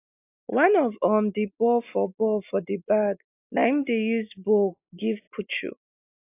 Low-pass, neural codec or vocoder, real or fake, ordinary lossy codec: 3.6 kHz; none; real; AAC, 24 kbps